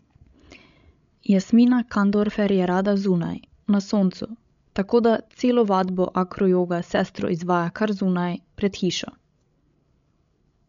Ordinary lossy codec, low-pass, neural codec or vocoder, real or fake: MP3, 64 kbps; 7.2 kHz; codec, 16 kHz, 16 kbps, FreqCodec, larger model; fake